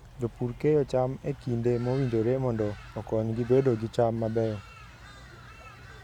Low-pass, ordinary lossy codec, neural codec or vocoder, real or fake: 19.8 kHz; none; vocoder, 44.1 kHz, 128 mel bands every 512 samples, BigVGAN v2; fake